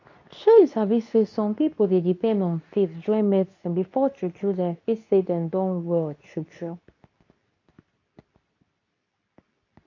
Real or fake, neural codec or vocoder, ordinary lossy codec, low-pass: fake; codec, 24 kHz, 0.9 kbps, WavTokenizer, medium speech release version 2; AAC, 48 kbps; 7.2 kHz